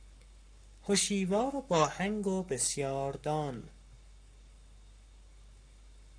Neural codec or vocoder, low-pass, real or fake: codec, 44.1 kHz, 7.8 kbps, Pupu-Codec; 9.9 kHz; fake